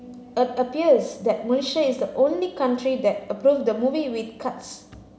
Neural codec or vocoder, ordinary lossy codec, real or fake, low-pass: none; none; real; none